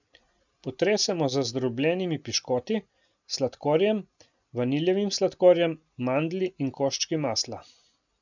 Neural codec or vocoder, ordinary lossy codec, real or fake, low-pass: none; none; real; 7.2 kHz